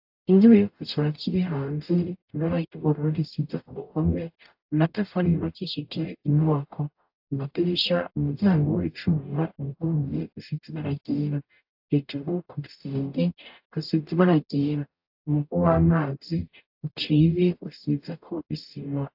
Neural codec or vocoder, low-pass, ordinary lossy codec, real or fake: codec, 44.1 kHz, 0.9 kbps, DAC; 5.4 kHz; AAC, 48 kbps; fake